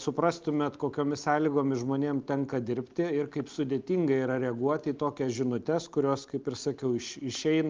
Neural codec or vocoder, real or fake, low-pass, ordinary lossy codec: none; real; 7.2 kHz; Opus, 24 kbps